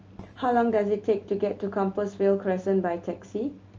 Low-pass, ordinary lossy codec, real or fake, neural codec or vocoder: 7.2 kHz; Opus, 24 kbps; real; none